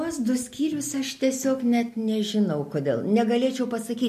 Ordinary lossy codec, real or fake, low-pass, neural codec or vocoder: MP3, 64 kbps; real; 14.4 kHz; none